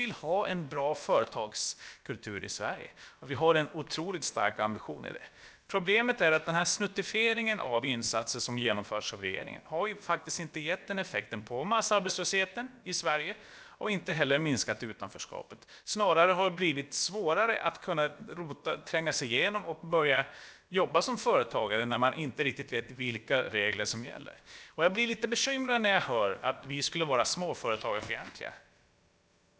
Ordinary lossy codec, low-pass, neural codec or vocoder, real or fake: none; none; codec, 16 kHz, about 1 kbps, DyCAST, with the encoder's durations; fake